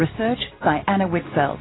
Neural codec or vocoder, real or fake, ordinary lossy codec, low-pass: none; real; AAC, 16 kbps; 7.2 kHz